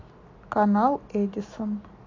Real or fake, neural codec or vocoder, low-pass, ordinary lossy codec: real; none; 7.2 kHz; AAC, 32 kbps